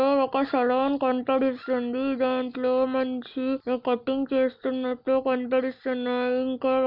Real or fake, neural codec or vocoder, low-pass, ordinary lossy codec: real; none; 5.4 kHz; none